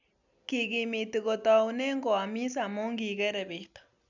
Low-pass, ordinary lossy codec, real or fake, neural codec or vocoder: 7.2 kHz; none; real; none